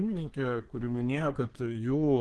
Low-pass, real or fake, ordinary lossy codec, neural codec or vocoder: 10.8 kHz; fake; Opus, 16 kbps; codec, 24 kHz, 1 kbps, SNAC